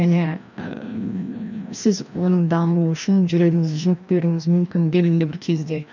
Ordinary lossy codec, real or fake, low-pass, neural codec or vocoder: none; fake; 7.2 kHz; codec, 16 kHz, 1 kbps, FreqCodec, larger model